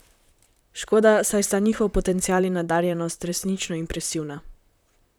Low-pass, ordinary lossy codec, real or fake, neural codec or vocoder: none; none; fake; vocoder, 44.1 kHz, 128 mel bands, Pupu-Vocoder